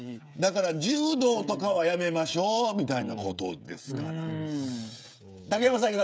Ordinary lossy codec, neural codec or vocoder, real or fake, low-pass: none; codec, 16 kHz, 16 kbps, FreqCodec, smaller model; fake; none